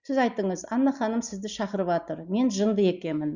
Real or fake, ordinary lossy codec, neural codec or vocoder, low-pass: real; Opus, 64 kbps; none; 7.2 kHz